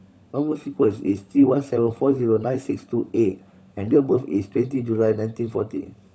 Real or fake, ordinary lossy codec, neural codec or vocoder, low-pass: fake; none; codec, 16 kHz, 16 kbps, FunCodec, trained on LibriTTS, 50 frames a second; none